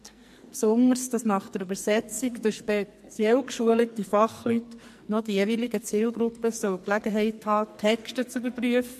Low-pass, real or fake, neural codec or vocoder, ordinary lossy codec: 14.4 kHz; fake; codec, 44.1 kHz, 2.6 kbps, SNAC; MP3, 64 kbps